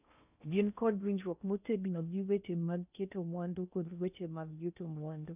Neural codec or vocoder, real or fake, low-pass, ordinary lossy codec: codec, 16 kHz in and 24 kHz out, 0.6 kbps, FocalCodec, streaming, 4096 codes; fake; 3.6 kHz; none